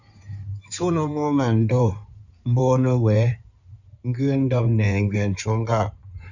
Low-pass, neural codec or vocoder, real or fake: 7.2 kHz; codec, 16 kHz in and 24 kHz out, 2.2 kbps, FireRedTTS-2 codec; fake